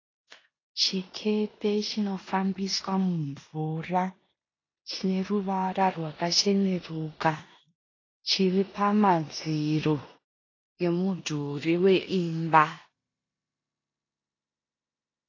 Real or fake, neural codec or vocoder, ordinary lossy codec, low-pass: fake; codec, 16 kHz in and 24 kHz out, 0.9 kbps, LongCat-Audio-Codec, four codebook decoder; AAC, 32 kbps; 7.2 kHz